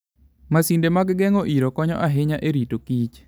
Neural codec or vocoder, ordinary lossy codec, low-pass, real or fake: none; none; none; real